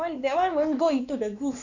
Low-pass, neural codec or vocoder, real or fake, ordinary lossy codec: 7.2 kHz; codec, 16 kHz, 2 kbps, X-Codec, WavLM features, trained on Multilingual LibriSpeech; fake; none